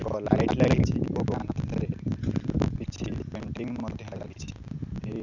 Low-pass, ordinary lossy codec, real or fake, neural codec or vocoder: 7.2 kHz; none; real; none